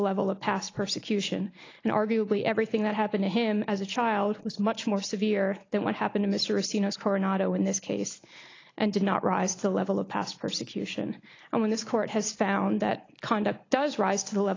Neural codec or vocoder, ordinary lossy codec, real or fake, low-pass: none; AAC, 32 kbps; real; 7.2 kHz